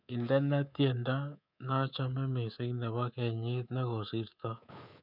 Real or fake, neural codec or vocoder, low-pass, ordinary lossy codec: fake; codec, 24 kHz, 3.1 kbps, DualCodec; 5.4 kHz; none